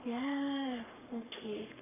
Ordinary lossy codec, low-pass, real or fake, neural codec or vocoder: none; 3.6 kHz; fake; codec, 44.1 kHz, 3.4 kbps, Pupu-Codec